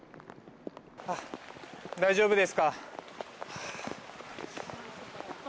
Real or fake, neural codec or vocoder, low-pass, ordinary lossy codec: real; none; none; none